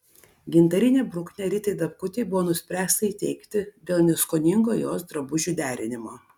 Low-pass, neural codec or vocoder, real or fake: 19.8 kHz; none; real